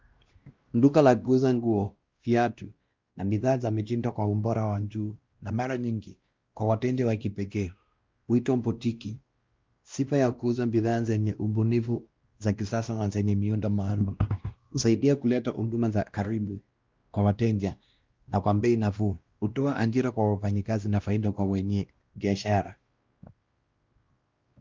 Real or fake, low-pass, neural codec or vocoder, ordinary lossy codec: fake; 7.2 kHz; codec, 16 kHz, 1 kbps, X-Codec, WavLM features, trained on Multilingual LibriSpeech; Opus, 24 kbps